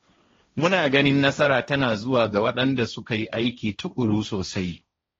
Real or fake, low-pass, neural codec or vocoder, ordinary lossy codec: fake; 7.2 kHz; codec, 16 kHz, 1.1 kbps, Voila-Tokenizer; AAC, 32 kbps